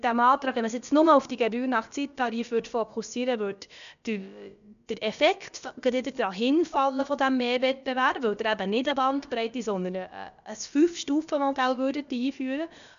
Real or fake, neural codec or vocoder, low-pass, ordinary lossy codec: fake; codec, 16 kHz, about 1 kbps, DyCAST, with the encoder's durations; 7.2 kHz; MP3, 96 kbps